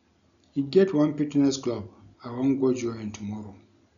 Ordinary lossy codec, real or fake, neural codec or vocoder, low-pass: none; real; none; 7.2 kHz